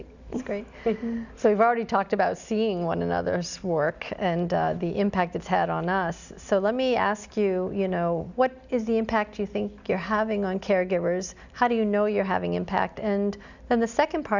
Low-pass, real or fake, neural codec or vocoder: 7.2 kHz; real; none